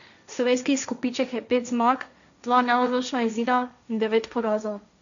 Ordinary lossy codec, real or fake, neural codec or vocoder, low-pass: none; fake; codec, 16 kHz, 1.1 kbps, Voila-Tokenizer; 7.2 kHz